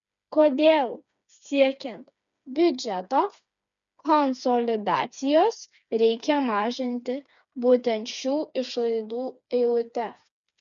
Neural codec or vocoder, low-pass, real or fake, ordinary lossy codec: codec, 16 kHz, 4 kbps, FreqCodec, smaller model; 7.2 kHz; fake; MP3, 96 kbps